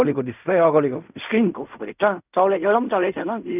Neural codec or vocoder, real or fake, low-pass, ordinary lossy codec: codec, 16 kHz in and 24 kHz out, 0.4 kbps, LongCat-Audio-Codec, fine tuned four codebook decoder; fake; 3.6 kHz; none